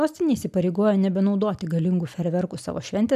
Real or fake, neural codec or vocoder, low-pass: real; none; 14.4 kHz